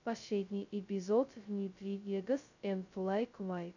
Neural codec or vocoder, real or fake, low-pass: codec, 16 kHz, 0.2 kbps, FocalCodec; fake; 7.2 kHz